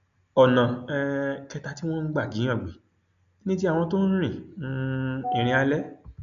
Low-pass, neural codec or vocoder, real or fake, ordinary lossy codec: 7.2 kHz; none; real; none